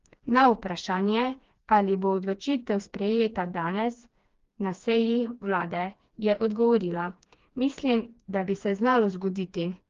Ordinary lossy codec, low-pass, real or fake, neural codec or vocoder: Opus, 32 kbps; 7.2 kHz; fake; codec, 16 kHz, 2 kbps, FreqCodec, smaller model